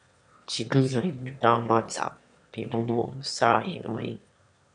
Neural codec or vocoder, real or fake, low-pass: autoencoder, 22.05 kHz, a latent of 192 numbers a frame, VITS, trained on one speaker; fake; 9.9 kHz